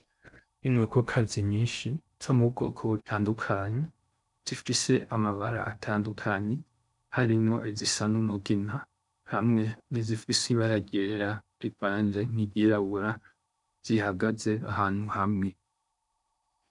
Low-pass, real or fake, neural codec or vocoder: 10.8 kHz; fake; codec, 16 kHz in and 24 kHz out, 0.6 kbps, FocalCodec, streaming, 4096 codes